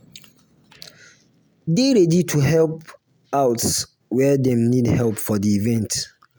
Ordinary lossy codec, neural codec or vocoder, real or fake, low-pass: none; none; real; none